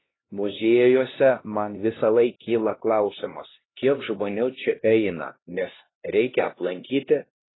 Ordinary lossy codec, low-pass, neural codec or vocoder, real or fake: AAC, 16 kbps; 7.2 kHz; codec, 16 kHz, 1 kbps, X-Codec, HuBERT features, trained on LibriSpeech; fake